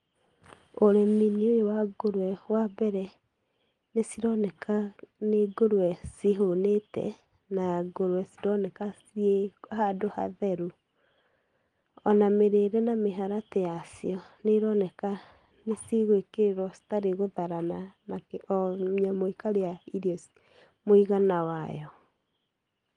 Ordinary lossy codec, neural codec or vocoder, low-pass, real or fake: Opus, 24 kbps; none; 9.9 kHz; real